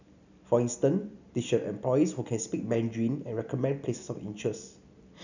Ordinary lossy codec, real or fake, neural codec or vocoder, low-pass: none; real; none; 7.2 kHz